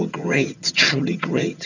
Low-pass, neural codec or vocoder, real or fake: 7.2 kHz; vocoder, 22.05 kHz, 80 mel bands, HiFi-GAN; fake